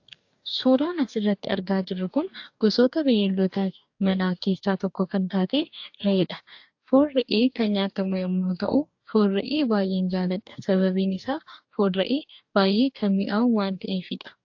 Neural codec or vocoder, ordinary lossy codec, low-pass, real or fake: codec, 44.1 kHz, 2.6 kbps, DAC; AAC, 48 kbps; 7.2 kHz; fake